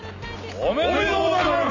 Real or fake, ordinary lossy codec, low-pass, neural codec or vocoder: real; none; 7.2 kHz; none